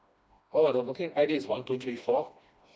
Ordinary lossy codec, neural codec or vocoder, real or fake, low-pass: none; codec, 16 kHz, 1 kbps, FreqCodec, smaller model; fake; none